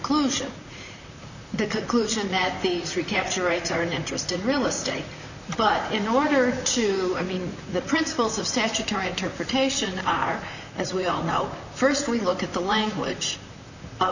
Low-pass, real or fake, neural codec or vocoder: 7.2 kHz; fake; vocoder, 44.1 kHz, 128 mel bands, Pupu-Vocoder